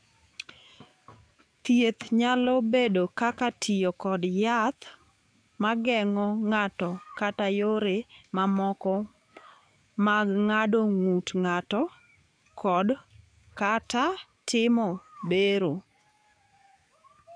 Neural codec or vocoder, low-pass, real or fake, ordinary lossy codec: codec, 44.1 kHz, 7.8 kbps, DAC; 9.9 kHz; fake; none